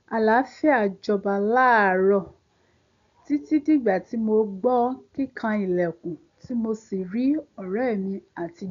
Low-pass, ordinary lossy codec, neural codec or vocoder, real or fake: 7.2 kHz; AAC, 64 kbps; none; real